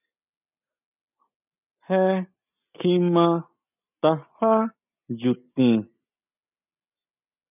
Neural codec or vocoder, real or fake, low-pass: none; real; 3.6 kHz